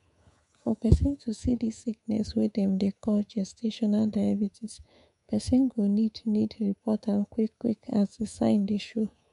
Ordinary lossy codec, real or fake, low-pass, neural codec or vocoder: MP3, 64 kbps; fake; 10.8 kHz; codec, 24 kHz, 3.1 kbps, DualCodec